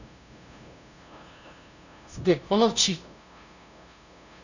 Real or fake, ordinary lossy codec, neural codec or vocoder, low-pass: fake; none; codec, 16 kHz, 0.5 kbps, FunCodec, trained on LibriTTS, 25 frames a second; 7.2 kHz